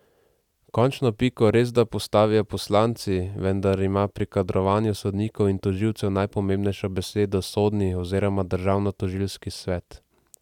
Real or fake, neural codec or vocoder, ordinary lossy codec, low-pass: real; none; none; 19.8 kHz